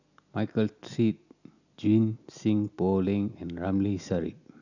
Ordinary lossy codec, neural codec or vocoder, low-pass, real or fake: none; none; 7.2 kHz; real